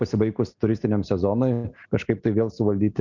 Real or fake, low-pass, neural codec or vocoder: real; 7.2 kHz; none